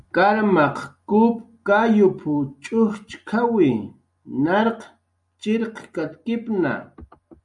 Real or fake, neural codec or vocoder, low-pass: real; none; 10.8 kHz